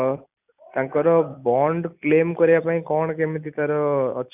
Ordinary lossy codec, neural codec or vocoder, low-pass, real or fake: none; none; 3.6 kHz; real